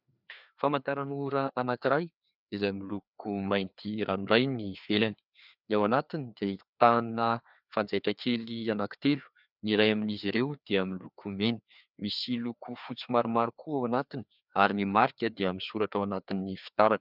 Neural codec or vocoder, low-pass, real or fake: codec, 16 kHz, 2 kbps, FreqCodec, larger model; 5.4 kHz; fake